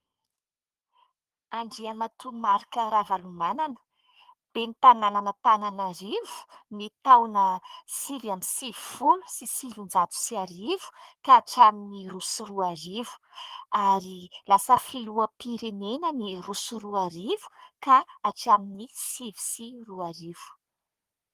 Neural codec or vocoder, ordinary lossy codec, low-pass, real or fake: codec, 44.1 kHz, 3.4 kbps, Pupu-Codec; Opus, 32 kbps; 14.4 kHz; fake